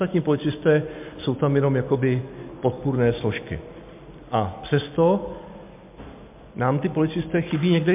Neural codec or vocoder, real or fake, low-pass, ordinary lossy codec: none; real; 3.6 kHz; MP3, 32 kbps